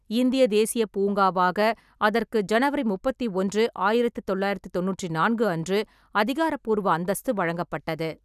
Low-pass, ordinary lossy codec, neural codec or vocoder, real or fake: 14.4 kHz; none; none; real